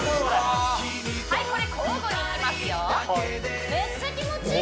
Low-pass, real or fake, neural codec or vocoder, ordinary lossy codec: none; real; none; none